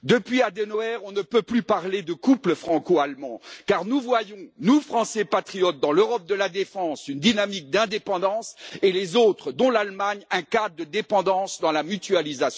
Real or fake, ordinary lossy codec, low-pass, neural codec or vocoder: real; none; none; none